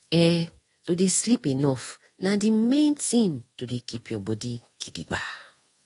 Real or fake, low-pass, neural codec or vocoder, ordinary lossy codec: fake; 10.8 kHz; codec, 24 kHz, 1.2 kbps, DualCodec; AAC, 32 kbps